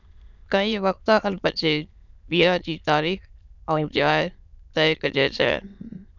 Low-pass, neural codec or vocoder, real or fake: 7.2 kHz; autoencoder, 22.05 kHz, a latent of 192 numbers a frame, VITS, trained on many speakers; fake